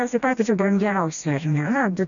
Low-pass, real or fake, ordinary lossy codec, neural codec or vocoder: 7.2 kHz; fake; MP3, 96 kbps; codec, 16 kHz, 1 kbps, FreqCodec, smaller model